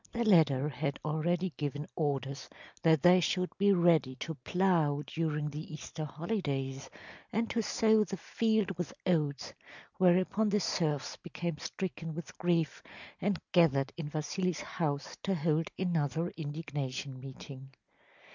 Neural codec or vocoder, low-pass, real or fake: none; 7.2 kHz; real